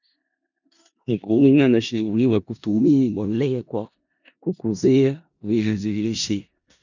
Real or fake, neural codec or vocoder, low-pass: fake; codec, 16 kHz in and 24 kHz out, 0.4 kbps, LongCat-Audio-Codec, four codebook decoder; 7.2 kHz